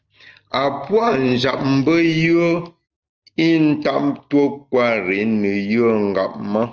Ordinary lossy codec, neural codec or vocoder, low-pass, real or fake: Opus, 24 kbps; none; 7.2 kHz; real